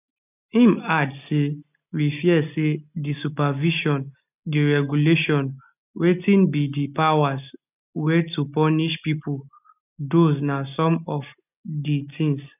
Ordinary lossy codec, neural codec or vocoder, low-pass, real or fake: none; none; 3.6 kHz; real